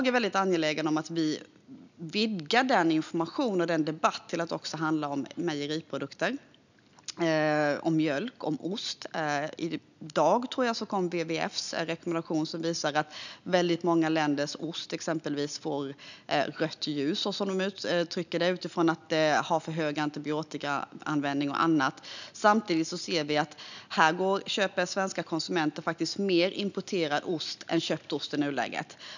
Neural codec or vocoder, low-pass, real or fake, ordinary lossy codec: none; 7.2 kHz; real; none